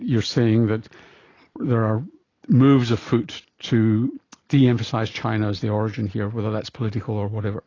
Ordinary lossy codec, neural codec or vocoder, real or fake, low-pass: AAC, 32 kbps; none; real; 7.2 kHz